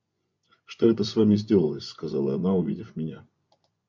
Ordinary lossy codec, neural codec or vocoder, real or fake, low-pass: MP3, 64 kbps; vocoder, 24 kHz, 100 mel bands, Vocos; fake; 7.2 kHz